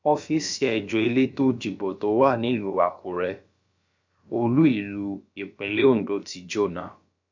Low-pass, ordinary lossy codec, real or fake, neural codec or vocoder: 7.2 kHz; MP3, 64 kbps; fake; codec, 16 kHz, about 1 kbps, DyCAST, with the encoder's durations